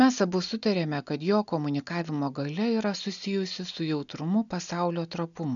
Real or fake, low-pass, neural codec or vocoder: real; 7.2 kHz; none